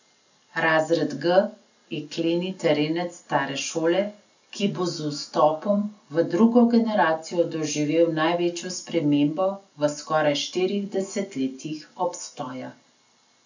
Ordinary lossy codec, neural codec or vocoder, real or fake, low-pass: none; none; real; 7.2 kHz